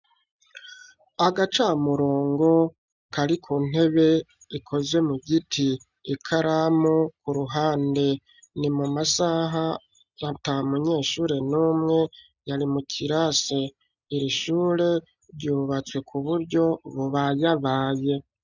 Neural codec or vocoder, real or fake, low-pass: none; real; 7.2 kHz